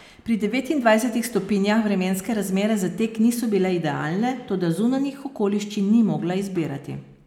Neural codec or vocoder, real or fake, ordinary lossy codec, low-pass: none; real; none; 19.8 kHz